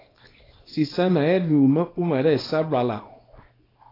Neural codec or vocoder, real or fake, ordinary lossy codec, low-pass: codec, 24 kHz, 0.9 kbps, WavTokenizer, small release; fake; AAC, 24 kbps; 5.4 kHz